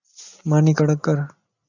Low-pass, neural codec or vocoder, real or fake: 7.2 kHz; none; real